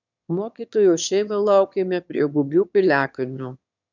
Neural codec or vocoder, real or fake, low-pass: autoencoder, 22.05 kHz, a latent of 192 numbers a frame, VITS, trained on one speaker; fake; 7.2 kHz